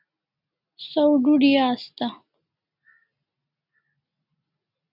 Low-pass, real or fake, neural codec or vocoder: 5.4 kHz; real; none